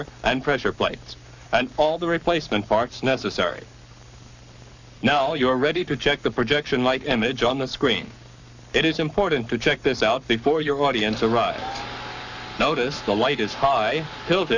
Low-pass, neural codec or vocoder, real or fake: 7.2 kHz; codec, 44.1 kHz, 7.8 kbps, Pupu-Codec; fake